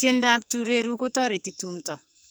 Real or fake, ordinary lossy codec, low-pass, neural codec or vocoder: fake; none; none; codec, 44.1 kHz, 2.6 kbps, SNAC